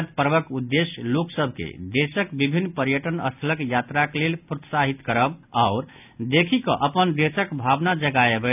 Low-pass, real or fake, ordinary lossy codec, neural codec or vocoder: 3.6 kHz; real; none; none